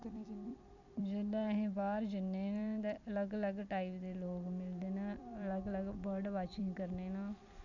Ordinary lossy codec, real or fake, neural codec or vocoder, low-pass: none; fake; vocoder, 44.1 kHz, 128 mel bands every 256 samples, BigVGAN v2; 7.2 kHz